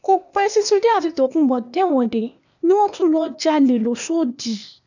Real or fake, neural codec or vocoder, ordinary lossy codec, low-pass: fake; codec, 16 kHz, 0.8 kbps, ZipCodec; none; 7.2 kHz